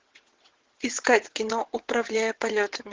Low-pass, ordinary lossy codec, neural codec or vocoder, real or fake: 7.2 kHz; Opus, 16 kbps; vocoder, 22.05 kHz, 80 mel bands, WaveNeXt; fake